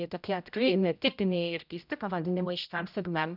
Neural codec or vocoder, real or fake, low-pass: codec, 16 kHz, 0.5 kbps, X-Codec, HuBERT features, trained on general audio; fake; 5.4 kHz